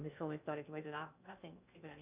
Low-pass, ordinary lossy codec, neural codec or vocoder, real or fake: 3.6 kHz; none; codec, 16 kHz in and 24 kHz out, 0.6 kbps, FocalCodec, streaming, 2048 codes; fake